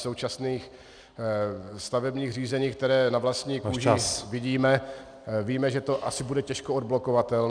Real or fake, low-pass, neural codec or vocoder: real; 9.9 kHz; none